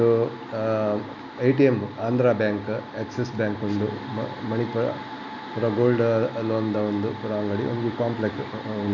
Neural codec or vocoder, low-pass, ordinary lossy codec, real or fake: none; 7.2 kHz; none; real